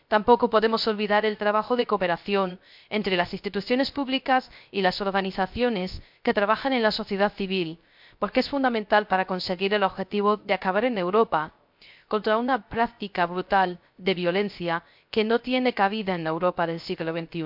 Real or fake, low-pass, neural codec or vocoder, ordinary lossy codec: fake; 5.4 kHz; codec, 16 kHz, 0.3 kbps, FocalCodec; MP3, 48 kbps